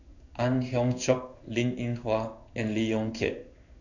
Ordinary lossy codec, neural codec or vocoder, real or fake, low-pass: none; codec, 16 kHz in and 24 kHz out, 1 kbps, XY-Tokenizer; fake; 7.2 kHz